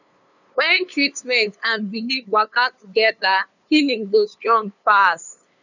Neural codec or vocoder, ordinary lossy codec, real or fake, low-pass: codec, 16 kHz, 2 kbps, FunCodec, trained on LibriTTS, 25 frames a second; none; fake; 7.2 kHz